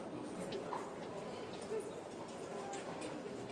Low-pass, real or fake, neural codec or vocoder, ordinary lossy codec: 9.9 kHz; real; none; Opus, 32 kbps